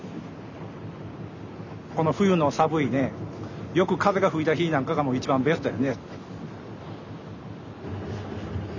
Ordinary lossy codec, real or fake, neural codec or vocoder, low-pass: none; real; none; 7.2 kHz